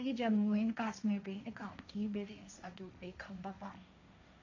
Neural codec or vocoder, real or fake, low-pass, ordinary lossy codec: codec, 16 kHz, 1.1 kbps, Voila-Tokenizer; fake; 7.2 kHz; none